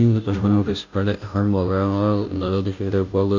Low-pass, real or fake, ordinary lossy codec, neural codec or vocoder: 7.2 kHz; fake; none; codec, 16 kHz, 0.5 kbps, FunCodec, trained on Chinese and English, 25 frames a second